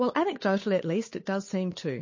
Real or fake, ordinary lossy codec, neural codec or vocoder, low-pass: fake; MP3, 32 kbps; codec, 16 kHz, 8 kbps, FunCodec, trained on Chinese and English, 25 frames a second; 7.2 kHz